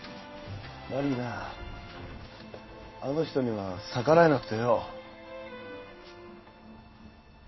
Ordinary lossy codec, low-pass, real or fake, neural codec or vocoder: MP3, 24 kbps; 7.2 kHz; fake; codec, 16 kHz in and 24 kHz out, 1 kbps, XY-Tokenizer